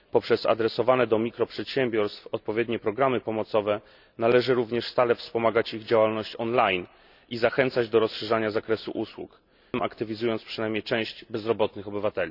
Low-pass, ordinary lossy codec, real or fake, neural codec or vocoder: 5.4 kHz; none; real; none